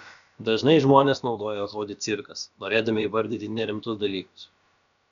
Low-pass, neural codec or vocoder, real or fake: 7.2 kHz; codec, 16 kHz, about 1 kbps, DyCAST, with the encoder's durations; fake